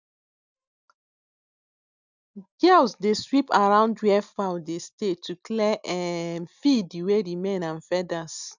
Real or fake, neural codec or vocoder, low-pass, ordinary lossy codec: real; none; 7.2 kHz; none